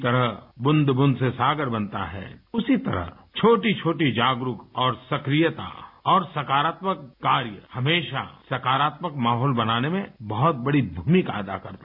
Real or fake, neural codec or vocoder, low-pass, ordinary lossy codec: real; none; 5.4 kHz; none